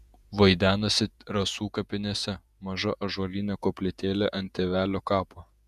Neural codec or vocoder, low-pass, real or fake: vocoder, 48 kHz, 128 mel bands, Vocos; 14.4 kHz; fake